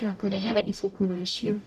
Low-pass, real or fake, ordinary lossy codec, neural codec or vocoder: 14.4 kHz; fake; none; codec, 44.1 kHz, 0.9 kbps, DAC